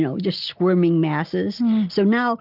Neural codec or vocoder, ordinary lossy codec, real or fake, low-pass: none; Opus, 32 kbps; real; 5.4 kHz